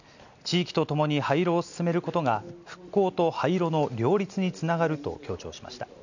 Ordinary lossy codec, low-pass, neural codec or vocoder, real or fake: none; 7.2 kHz; none; real